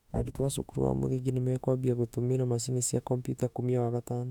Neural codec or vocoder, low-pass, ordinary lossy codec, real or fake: autoencoder, 48 kHz, 32 numbers a frame, DAC-VAE, trained on Japanese speech; 19.8 kHz; none; fake